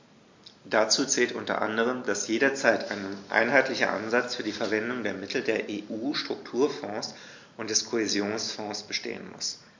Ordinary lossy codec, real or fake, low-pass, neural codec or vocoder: MP3, 48 kbps; real; 7.2 kHz; none